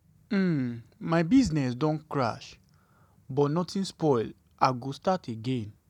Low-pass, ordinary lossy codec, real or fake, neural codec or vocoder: 19.8 kHz; none; real; none